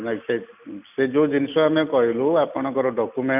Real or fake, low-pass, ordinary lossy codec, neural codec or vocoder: real; 3.6 kHz; none; none